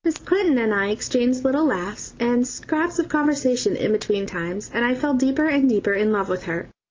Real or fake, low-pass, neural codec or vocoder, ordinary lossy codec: real; 7.2 kHz; none; Opus, 24 kbps